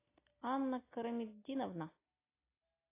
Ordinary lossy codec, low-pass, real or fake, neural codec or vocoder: AAC, 24 kbps; 3.6 kHz; real; none